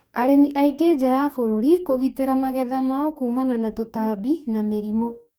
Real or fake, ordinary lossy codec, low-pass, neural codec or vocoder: fake; none; none; codec, 44.1 kHz, 2.6 kbps, DAC